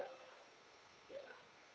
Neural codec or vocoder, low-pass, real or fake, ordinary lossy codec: codec, 16 kHz, 2 kbps, FunCodec, trained on Chinese and English, 25 frames a second; none; fake; none